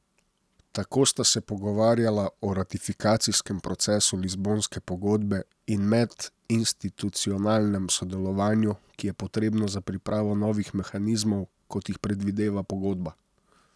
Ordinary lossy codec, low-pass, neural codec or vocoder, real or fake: none; none; none; real